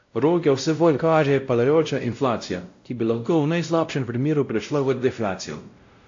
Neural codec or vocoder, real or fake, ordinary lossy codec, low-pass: codec, 16 kHz, 0.5 kbps, X-Codec, WavLM features, trained on Multilingual LibriSpeech; fake; AAC, 48 kbps; 7.2 kHz